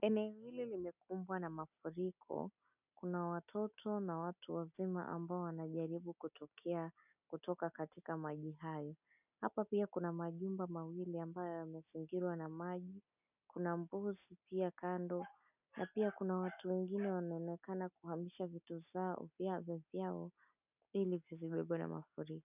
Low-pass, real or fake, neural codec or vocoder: 3.6 kHz; real; none